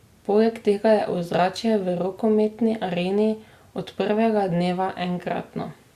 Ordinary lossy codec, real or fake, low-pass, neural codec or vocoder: Opus, 64 kbps; real; 14.4 kHz; none